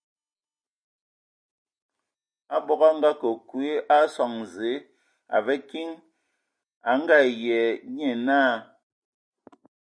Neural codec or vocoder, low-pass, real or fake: none; 9.9 kHz; real